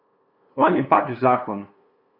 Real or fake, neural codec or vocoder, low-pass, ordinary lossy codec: fake; codec, 16 kHz, 2 kbps, FunCodec, trained on LibriTTS, 25 frames a second; 5.4 kHz; none